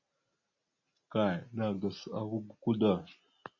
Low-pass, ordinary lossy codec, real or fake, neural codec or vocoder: 7.2 kHz; MP3, 32 kbps; real; none